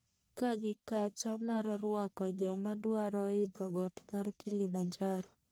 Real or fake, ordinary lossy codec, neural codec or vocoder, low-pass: fake; none; codec, 44.1 kHz, 1.7 kbps, Pupu-Codec; none